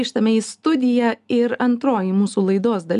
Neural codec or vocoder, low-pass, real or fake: none; 10.8 kHz; real